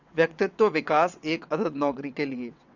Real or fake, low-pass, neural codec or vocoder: fake; 7.2 kHz; vocoder, 22.05 kHz, 80 mel bands, WaveNeXt